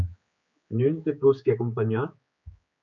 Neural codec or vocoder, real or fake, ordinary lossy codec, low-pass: codec, 16 kHz, 4 kbps, X-Codec, HuBERT features, trained on general audio; fake; MP3, 64 kbps; 7.2 kHz